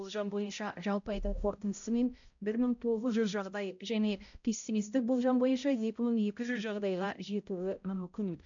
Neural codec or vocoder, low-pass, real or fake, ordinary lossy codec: codec, 16 kHz, 0.5 kbps, X-Codec, HuBERT features, trained on balanced general audio; 7.2 kHz; fake; AAC, 64 kbps